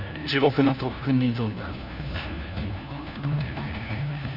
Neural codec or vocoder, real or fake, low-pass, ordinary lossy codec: codec, 16 kHz, 1 kbps, FunCodec, trained on LibriTTS, 50 frames a second; fake; 5.4 kHz; none